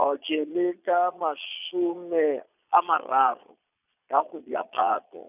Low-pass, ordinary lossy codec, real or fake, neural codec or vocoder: 3.6 kHz; none; fake; vocoder, 22.05 kHz, 80 mel bands, Vocos